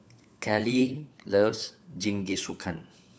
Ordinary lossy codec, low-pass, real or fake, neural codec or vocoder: none; none; fake; codec, 16 kHz, 4 kbps, FunCodec, trained on LibriTTS, 50 frames a second